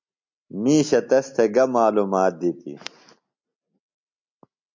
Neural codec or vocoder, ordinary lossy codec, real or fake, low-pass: none; MP3, 64 kbps; real; 7.2 kHz